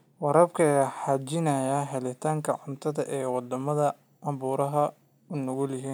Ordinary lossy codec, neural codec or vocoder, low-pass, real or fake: none; none; none; real